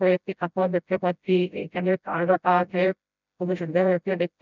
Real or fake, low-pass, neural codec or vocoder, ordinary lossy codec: fake; 7.2 kHz; codec, 16 kHz, 0.5 kbps, FreqCodec, smaller model; none